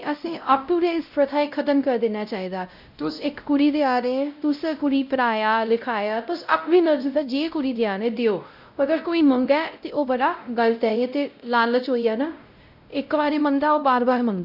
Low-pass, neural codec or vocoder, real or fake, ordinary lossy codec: 5.4 kHz; codec, 16 kHz, 0.5 kbps, X-Codec, WavLM features, trained on Multilingual LibriSpeech; fake; none